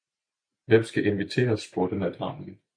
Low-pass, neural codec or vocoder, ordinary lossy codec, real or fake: 9.9 kHz; none; MP3, 48 kbps; real